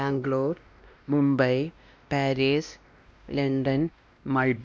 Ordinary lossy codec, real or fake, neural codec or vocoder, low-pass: none; fake; codec, 16 kHz, 1 kbps, X-Codec, WavLM features, trained on Multilingual LibriSpeech; none